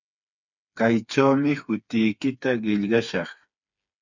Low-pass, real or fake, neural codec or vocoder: 7.2 kHz; fake; codec, 16 kHz, 4 kbps, FreqCodec, smaller model